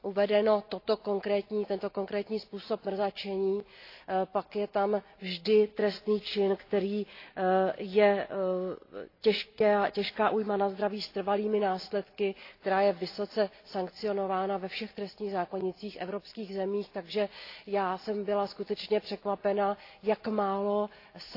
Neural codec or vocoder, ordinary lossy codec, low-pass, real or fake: none; AAC, 32 kbps; 5.4 kHz; real